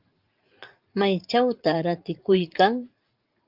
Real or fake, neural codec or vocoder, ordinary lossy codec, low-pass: fake; vocoder, 44.1 kHz, 80 mel bands, Vocos; Opus, 32 kbps; 5.4 kHz